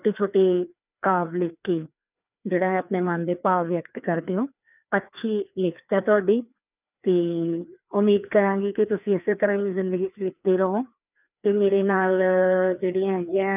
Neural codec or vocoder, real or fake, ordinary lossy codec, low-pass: codec, 16 kHz, 2 kbps, FreqCodec, larger model; fake; none; 3.6 kHz